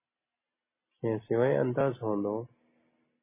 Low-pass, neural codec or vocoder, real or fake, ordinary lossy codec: 3.6 kHz; none; real; MP3, 16 kbps